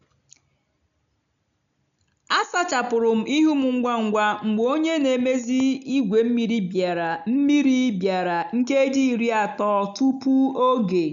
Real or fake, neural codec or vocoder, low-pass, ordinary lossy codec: real; none; 7.2 kHz; none